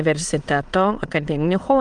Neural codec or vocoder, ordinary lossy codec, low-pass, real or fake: autoencoder, 22.05 kHz, a latent of 192 numbers a frame, VITS, trained on many speakers; Opus, 24 kbps; 9.9 kHz; fake